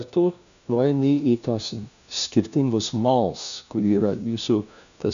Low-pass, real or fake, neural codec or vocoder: 7.2 kHz; fake; codec, 16 kHz, 1 kbps, FunCodec, trained on LibriTTS, 50 frames a second